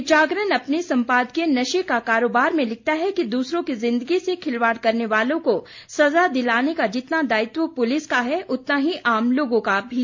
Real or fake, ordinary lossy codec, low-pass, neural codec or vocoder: real; MP3, 48 kbps; 7.2 kHz; none